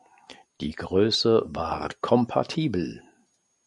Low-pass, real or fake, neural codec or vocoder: 10.8 kHz; real; none